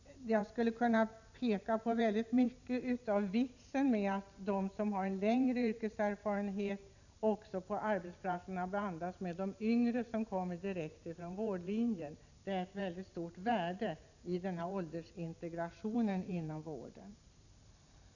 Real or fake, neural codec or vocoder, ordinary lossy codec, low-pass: fake; vocoder, 44.1 kHz, 128 mel bands every 512 samples, BigVGAN v2; none; 7.2 kHz